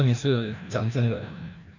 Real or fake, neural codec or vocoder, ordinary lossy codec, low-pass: fake; codec, 16 kHz, 1 kbps, FreqCodec, larger model; none; 7.2 kHz